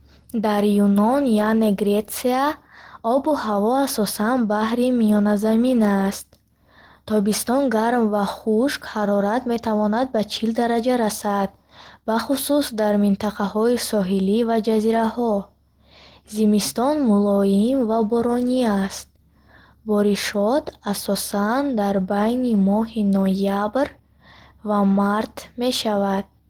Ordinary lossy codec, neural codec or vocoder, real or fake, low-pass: Opus, 16 kbps; none; real; 19.8 kHz